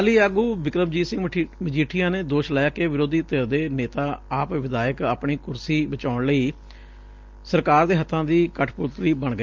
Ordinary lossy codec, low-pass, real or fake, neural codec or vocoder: Opus, 24 kbps; 7.2 kHz; real; none